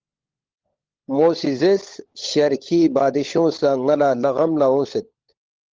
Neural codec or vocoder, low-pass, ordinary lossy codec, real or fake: codec, 16 kHz, 16 kbps, FunCodec, trained on LibriTTS, 50 frames a second; 7.2 kHz; Opus, 16 kbps; fake